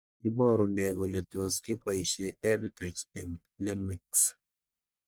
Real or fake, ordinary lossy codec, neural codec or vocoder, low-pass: fake; none; codec, 44.1 kHz, 1.7 kbps, Pupu-Codec; none